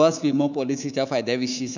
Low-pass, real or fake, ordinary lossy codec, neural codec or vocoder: 7.2 kHz; fake; none; codec, 24 kHz, 3.1 kbps, DualCodec